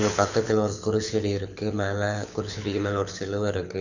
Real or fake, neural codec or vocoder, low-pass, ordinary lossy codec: fake; codec, 24 kHz, 6 kbps, HILCodec; 7.2 kHz; none